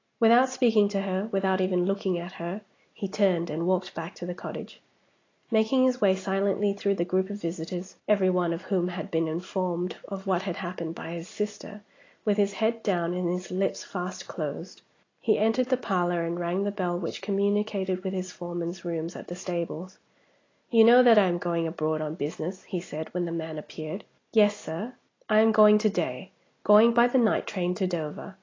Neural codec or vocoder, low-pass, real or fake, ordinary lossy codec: none; 7.2 kHz; real; AAC, 32 kbps